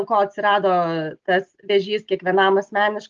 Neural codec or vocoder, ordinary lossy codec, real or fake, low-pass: none; Opus, 32 kbps; real; 7.2 kHz